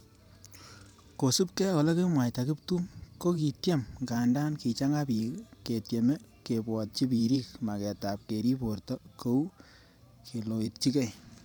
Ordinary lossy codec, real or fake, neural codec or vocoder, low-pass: none; fake; vocoder, 44.1 kHz, 128 mel bands every 512 samples, BigVGAN v2; none